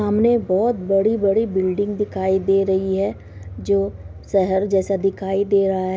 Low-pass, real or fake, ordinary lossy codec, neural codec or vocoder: none; real; none; none